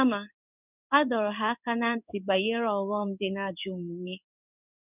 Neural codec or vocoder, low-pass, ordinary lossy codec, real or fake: codec, 16 kHz in and 24 kHz out, 1 kbps, XY-Tokenizer; 3.6 kHz; none; fake